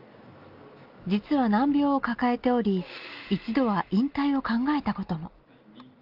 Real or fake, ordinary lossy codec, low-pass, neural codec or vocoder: real; Opus, 32 kbps; 5.4 kHz; none